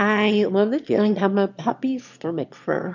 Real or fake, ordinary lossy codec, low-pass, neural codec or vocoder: fake; MP3, 64 kbps; 7.2 kHz; autoencoder, 22.05 kHz, a latent of 192 numbers a frame, VITS, trained on one speaker